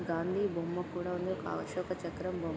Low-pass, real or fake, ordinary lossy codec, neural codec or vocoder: none; real; none; none